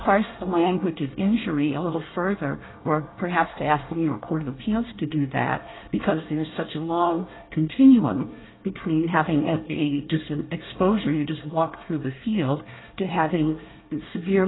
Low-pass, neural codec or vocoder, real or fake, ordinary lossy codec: 7.2 kHz; codec, 24 kHz, 1 kbps, SNAC; fake; AAC, 16 kbps